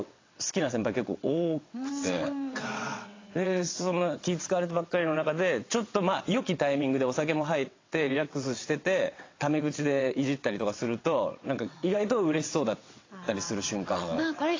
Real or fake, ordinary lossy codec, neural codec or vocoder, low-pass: fake; AAC, 32 kbps; vocoder, 22.05 kHz, 80 mel bands, WaveNeXt; 7.2 kHz